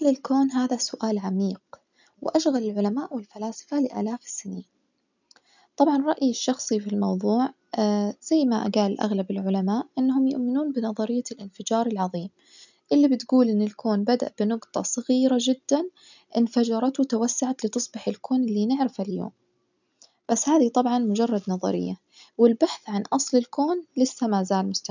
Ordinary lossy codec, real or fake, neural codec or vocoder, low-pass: none; real; none; 7.2 kHz